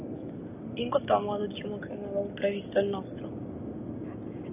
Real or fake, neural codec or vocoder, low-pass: real; none; 3.6 kHz